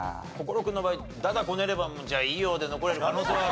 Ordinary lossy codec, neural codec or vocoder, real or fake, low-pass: none; none; real; none